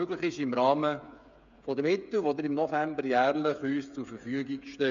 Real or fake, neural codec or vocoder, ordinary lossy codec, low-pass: fake; codec, 16 kHz, 8 kbps, FreqCodec, smaller model; MP3, 64 kbps; 7.2 kHz